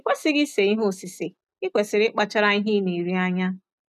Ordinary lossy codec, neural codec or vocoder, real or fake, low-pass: none; none; real; 14.4 kHz